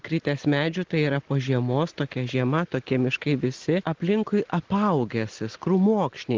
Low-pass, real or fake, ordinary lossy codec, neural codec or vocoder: 7.2 kHz; real; Opus, 16 kbps; none